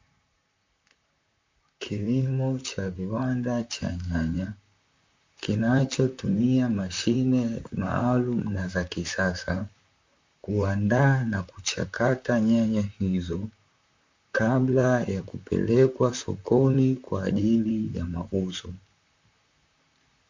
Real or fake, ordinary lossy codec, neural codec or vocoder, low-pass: fake; MP3, 48 kbps; vocoder, 44.1 kHz, 128 mel bands, Pupu-Vocoder; 7.2 kHz